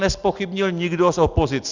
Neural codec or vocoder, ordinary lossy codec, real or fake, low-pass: none; Opus, 64 kbps; real; 7.2 kHz